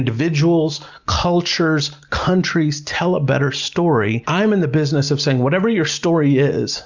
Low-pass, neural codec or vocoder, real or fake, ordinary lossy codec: 7.2 kHz; none; real; Opus, 64 kbps